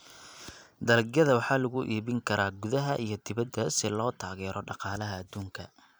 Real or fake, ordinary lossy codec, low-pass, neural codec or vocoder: fake; none; none; vocoder, 44.1 kHz, 128 mel bands every 256 samples, BigVGAN v2